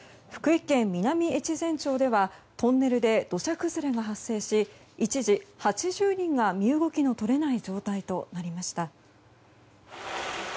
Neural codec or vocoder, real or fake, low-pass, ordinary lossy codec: none; real; none; none